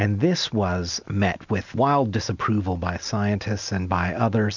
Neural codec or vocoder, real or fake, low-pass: none; real; 7.2 kHz